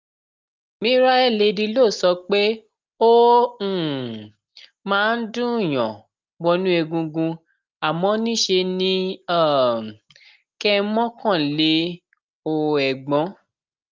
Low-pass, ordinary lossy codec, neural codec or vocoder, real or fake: 7.2 kHz; Opus, 24 kbps; none; real